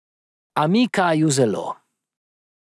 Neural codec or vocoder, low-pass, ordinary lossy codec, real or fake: none; none; none; real